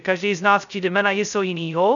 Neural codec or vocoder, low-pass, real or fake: codec, 16 kHz, 0.2 kbps, FocalCodec; 7.2 kHz; fake